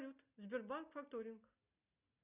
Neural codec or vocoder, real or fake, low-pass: none; real; 3.6 kHz